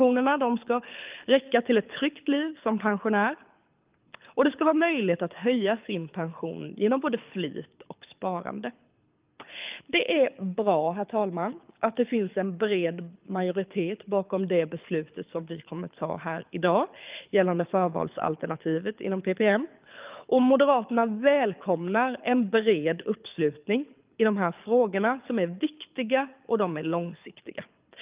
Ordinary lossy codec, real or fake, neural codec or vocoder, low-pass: Opus, 24 kbps; fake; codec, 24 kHz, 6 kbps, HILCodec; 3.6 kHz